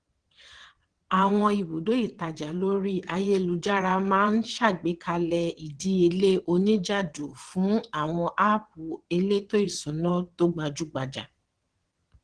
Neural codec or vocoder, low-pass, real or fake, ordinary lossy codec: vocoder, 22.05 kHz, 80 mel bands, WaveNeXt; 9.9 kHz; fake; Opus, 16 kbps